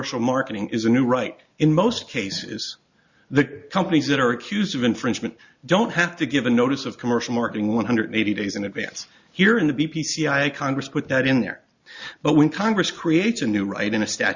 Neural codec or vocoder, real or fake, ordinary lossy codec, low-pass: none; real; Opus, 64 kbps; 7.2 kHz